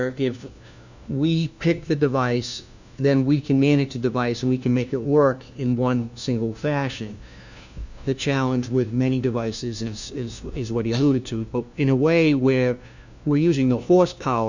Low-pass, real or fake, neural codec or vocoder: 7.2 kHz; fake; codec, 16 kHz, 1 kbps, FunCodec, trained on LibriTTS, 50 frames a second